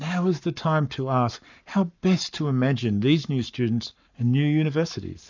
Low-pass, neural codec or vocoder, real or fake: 7.2 kHz; codec, 44.1 kHz, 7.8 kbps, Pupu-Codec; fake